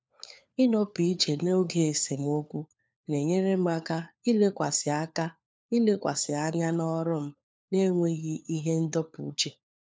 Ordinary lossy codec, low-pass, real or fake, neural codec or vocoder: none; none; fake; codec, 16 kHz, 4 kbps, FunCodec, trained on LibriTTS, 50 frames a second